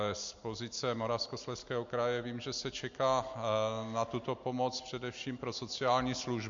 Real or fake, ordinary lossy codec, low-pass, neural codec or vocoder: real; MP3, 48 kbps; 7.2 kHz; none